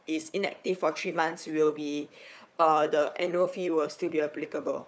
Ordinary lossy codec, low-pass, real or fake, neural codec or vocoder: none; none; fake; codec, 16 kHz, 4 kbps, FunCodec, trained on Chinese and English, 50 frames a second